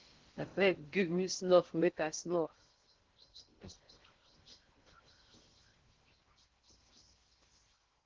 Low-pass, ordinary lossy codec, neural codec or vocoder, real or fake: 7.2 kHz; Opus, 16 kbps; codec, 16 kHz in and 24 kHz out, 0.6 kbps, FocalCodec, streaming, 4096 codes; fake